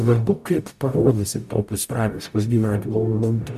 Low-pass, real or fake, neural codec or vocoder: 14.4 kHz; fake; codec, 44.1 kHz, 0.9 kbps, DAC